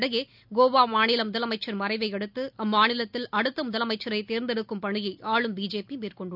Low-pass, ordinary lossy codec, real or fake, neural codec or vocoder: 5.4 kHz; none; real; none